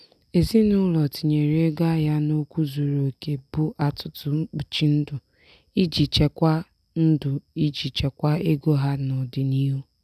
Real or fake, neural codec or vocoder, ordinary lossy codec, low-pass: real; none; none; 14.4 kHz